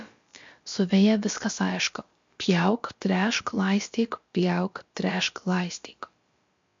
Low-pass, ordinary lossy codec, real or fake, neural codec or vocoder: 7.2 kHz; MP3, 48 kbps; fake; codec, 16 kHz, about 1 kbps, DyCAST, with the encoder's durations